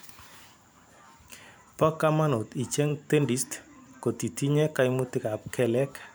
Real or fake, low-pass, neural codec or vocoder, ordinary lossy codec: fake; none; vocoder, 44.1 kHz, 128 mel bands every 512 samples, BigVGAN v2; none